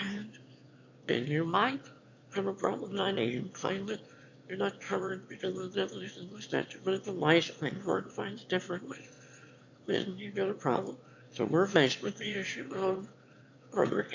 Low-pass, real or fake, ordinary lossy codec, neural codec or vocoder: 7.2 kHz; fake; MP3, 48 kbps; autoencoder, 22.05 kHz, a latent of 192 numbers a frame, VITS, trained on one speaker